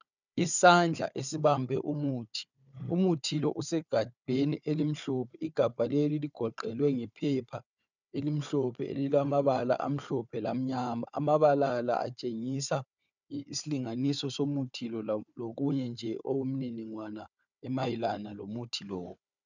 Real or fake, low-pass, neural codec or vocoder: fake; 7.2 kHz; codec, 16 kHz, 4 kbps, FunCodec, trained on Chinese and English, 50 frames a second